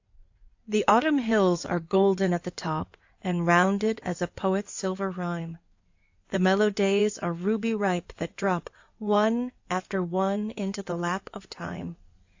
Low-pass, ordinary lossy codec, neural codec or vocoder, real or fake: 7.2 kHz; AAC, 48 kbps; codec, 16 kHz in and 24 kHz out, 2.2 kbps, FireRedTTS-2 codec; fake